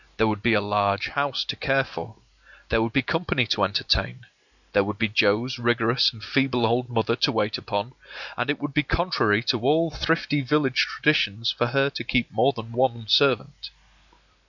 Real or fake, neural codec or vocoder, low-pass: real; none; 7.2 kHz